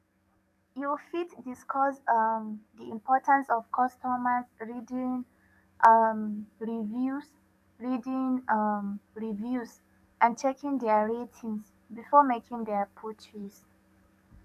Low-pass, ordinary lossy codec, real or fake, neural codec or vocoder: 14.4 kHz; none; fake; codec, 44.1 kHz, 7.8 kbps, DAC